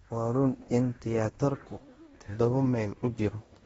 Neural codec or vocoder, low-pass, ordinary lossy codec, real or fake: codec, 16 kHz in and 24 kHz out, 0.9 kbps, LongCat-Audio-Codec, fine tuned four codebook decoder; 10.8 kHz; AAC, 24 kbps; fake